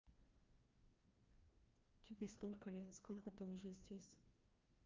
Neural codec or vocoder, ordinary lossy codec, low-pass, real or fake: codec, 16 kHz, 1 kbps, FreqCodec, larger model; Opus, 32 kbps; 7.2 kHz; fake